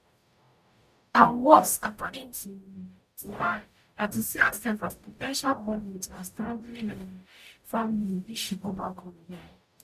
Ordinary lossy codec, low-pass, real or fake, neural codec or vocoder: none; 14.4 kHz; fake; codec, 44.1 kHz, 0.9 kbps, DAC